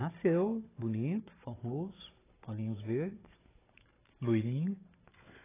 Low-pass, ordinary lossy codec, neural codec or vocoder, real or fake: 3.6 kHz; AAC, 16 kbps; codec, 16 kHz, 16 kbps, FunCodec, trained on Chinese and English, 50 frames a second; fake